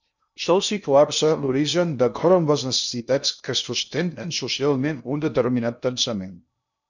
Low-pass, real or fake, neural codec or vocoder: 7.2 kHz; fake; codec, 16 kHz in and 24 kHz out, 0.6 kbps, FocalCodec, streaming, 2048 codes